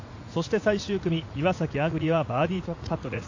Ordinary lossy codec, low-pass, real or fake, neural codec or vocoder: AAC, 48 kbps; 7.2 kHz; fake; vocoder, 44.1 kHz, 80 mel bands, Vocos